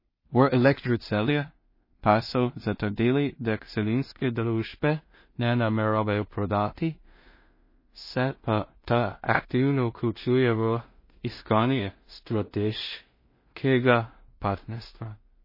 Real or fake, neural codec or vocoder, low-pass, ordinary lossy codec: fake; codec, 16 kHz in and 24 kHz out, 0.4 kbps, LongCat-Audio-Codec, two codebook decoder; 5.4 kHz; MP3, 24 kbps